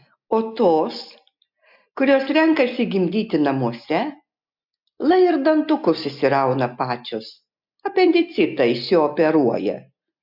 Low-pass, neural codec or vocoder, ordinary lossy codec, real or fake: 5.4 kHz; none; MP3, 48 kbps; real